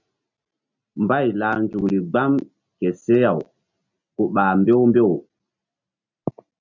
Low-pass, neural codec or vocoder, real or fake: 7.2 kHz; none; real